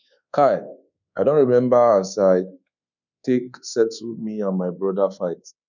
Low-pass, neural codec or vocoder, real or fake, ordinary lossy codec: 7.2 kHz; codec, 24 kHz, 1.2 kbps, DualCodec; fake; none